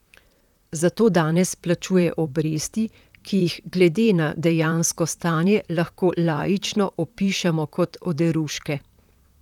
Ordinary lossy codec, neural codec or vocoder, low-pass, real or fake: none; vocoder, 44.1 kHz, 128 mel bands, Pupu-Vocoder; 19.8 kHz; fake